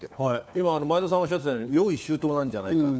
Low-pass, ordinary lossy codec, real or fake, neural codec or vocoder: none; none; fake; codec, 16 kHz, 4 kbps, FunCodec, trained on LibriTTS, 50 frames a second